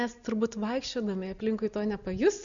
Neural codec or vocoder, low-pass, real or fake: none; 7.2 kHz; real